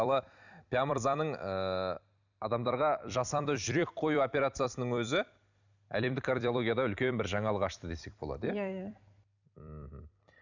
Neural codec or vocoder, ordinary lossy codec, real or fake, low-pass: vocoder, 44.1 kHz, 128 mel bands every 256 samples, BigVGAN v2; none; fake; 7.2 kHz